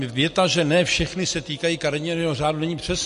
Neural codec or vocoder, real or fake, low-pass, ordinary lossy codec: none; real; 10.8 kHz; MP3, 48 kbps